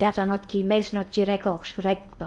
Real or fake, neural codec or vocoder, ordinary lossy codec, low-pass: fake; codec, 16 kHz in and 24 kHz out, 0.8 kbps, FocalCodec, streaming, 65536 codes; none; 10.8 kHz